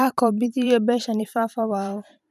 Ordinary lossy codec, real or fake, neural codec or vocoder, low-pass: none; fake; vocoder, 44.1 kHz, 128 mel bands every 512 samples, BigVGAN v2; 14.4 kHz